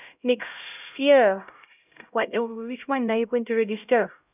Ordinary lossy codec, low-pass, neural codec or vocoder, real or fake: none; 3.6 kHz; codec, 16 kHz, 0.5 kbps, X-Codec, HuBERT features, trained on LibriSpeech; fake